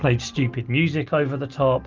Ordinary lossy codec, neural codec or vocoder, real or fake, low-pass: Opus, 24 kbps; none; real; 7.2 kHz